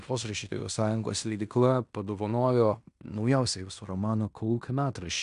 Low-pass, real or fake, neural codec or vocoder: 10.8 kHz; fake; codec, 16 kHz in and 24 kHz out, 0.9 kbps, LongCat-Audio-Codec, fine tuned four codebook decoder